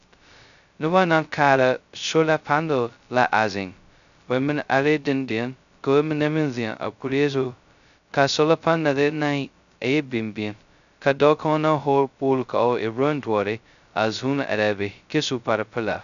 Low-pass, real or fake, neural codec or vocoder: 7.2 kHz; fake; codec, 16 kHz, 0.2 kbps, FocalCodec